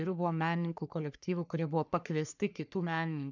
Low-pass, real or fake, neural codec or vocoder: 7.2 kHz; fake; codec, 44.1 kHz, 3.4 kbps, Pupu-Codec